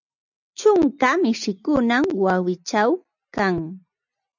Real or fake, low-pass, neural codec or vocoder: real; 7.2 kHz; none